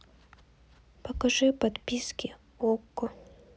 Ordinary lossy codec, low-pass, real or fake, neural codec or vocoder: none; none; real; none